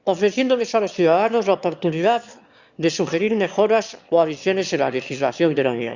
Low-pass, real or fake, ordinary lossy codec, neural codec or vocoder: 7.2 kHz; fake; Opus, 64 kbps; autoencoder, 22.05 kHz, a latent of 192 numbers a frame, VITS, trained on one speaker